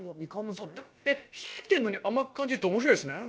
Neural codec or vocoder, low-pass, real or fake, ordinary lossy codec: codec, 16 kHz, about 1 kbps, DyCAST, with the encoder's durations; none; fake; none